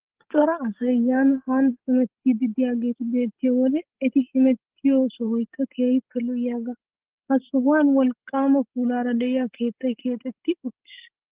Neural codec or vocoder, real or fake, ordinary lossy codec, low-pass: codec, 16 kHz, 16 kbps, FreqCodec, larger model; fake; Opus, 16 kbps; 3.6 kHz